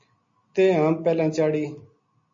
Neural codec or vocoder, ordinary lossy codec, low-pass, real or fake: none; MP3, 32 kbps; 7.2 kHz; real